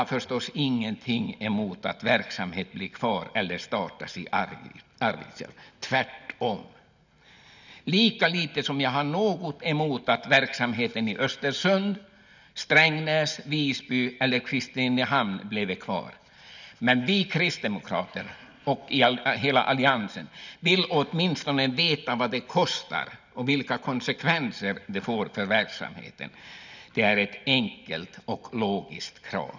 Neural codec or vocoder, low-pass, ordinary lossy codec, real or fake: none; 7.2 kHz; none; real